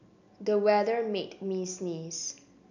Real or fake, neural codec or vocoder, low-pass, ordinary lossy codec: real; none; 7.2 kHz; none